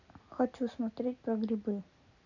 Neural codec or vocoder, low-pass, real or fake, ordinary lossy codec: none; 7.2 kHz; real; none